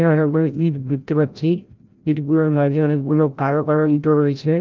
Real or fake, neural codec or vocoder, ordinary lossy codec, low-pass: fake; codec, 16 kHz, 0.5 kbps, FreqCodec, larger model; Opus, 24 kbps; 7.2 kHz